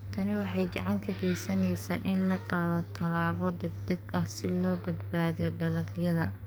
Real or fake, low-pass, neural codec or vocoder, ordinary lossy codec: fake; none; codec, 44.1 kHz, 2.6 kbps, SNAC; none